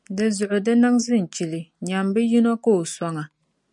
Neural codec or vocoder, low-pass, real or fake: none; 10.8 kHz; real